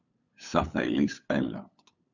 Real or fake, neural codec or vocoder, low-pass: fake; codec, 16 kHz, 8 kbps, FunCodec, trained on LibriTTS, 25 frames a second; 7.2 kHz